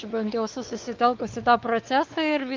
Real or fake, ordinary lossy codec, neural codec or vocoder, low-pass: fake; Opus, 24 kbps; codec, 16 kHz, 4 kbps, X-Codec, WavLM features, trained on Multilingual LibriSpeech; 7.2 kHz